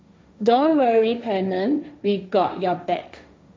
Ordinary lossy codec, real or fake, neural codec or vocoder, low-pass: none; fake; codec, 16 kHz, 1.1 kbps, Voila-Tokenizer; none